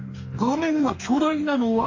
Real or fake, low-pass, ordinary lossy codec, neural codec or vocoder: fake; 7.2 kHz; AAC, 48 kbps; codec, 44.1 kHz, 2.6 kbps, DAC